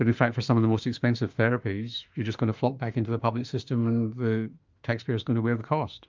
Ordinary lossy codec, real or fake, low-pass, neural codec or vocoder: Opus, 24 kbps; fake; 7.2 kHz; autoencoder, 48 kHz, 32 numbers a frame, DAC-VAE, trained on Japanese speech